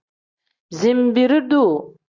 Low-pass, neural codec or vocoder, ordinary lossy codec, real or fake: 7.2 kHz; none; Opus, 64 kbps; real